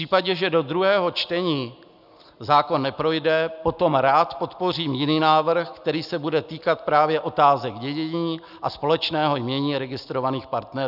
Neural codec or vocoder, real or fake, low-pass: none; real; 5.4 kHz